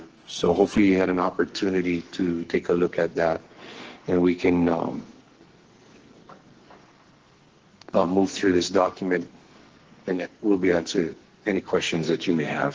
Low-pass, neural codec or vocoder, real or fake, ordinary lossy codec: 7.2 kHz; codec, 44.1 kHz, 2.6 kbps, SNAC; fake; Opus, 16 kbps